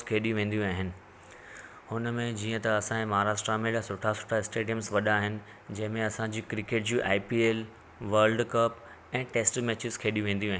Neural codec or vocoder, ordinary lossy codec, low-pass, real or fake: none; none; none; real